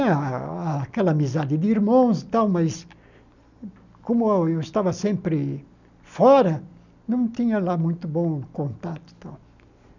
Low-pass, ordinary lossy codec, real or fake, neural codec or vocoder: 7.2 kHz; none; real; none